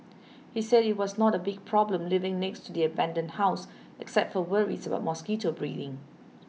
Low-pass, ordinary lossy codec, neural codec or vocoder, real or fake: none; none; none; real